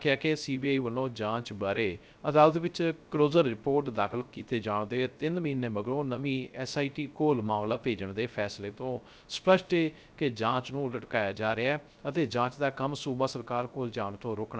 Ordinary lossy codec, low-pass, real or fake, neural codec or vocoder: none; none; fake; codec, 16 kHz, 0.3 kbps, FocalCodec